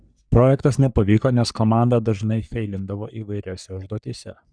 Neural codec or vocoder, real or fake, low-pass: codec, 44.1 kHz, 3.4 kbps, Pupu-Codec; fake; 9.9 kHz